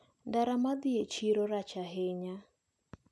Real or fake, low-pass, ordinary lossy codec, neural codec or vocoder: real; none; none; none